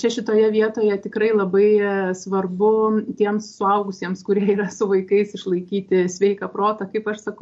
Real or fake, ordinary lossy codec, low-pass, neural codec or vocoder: real; MP3, 48 kbps; 10.8 kHz; none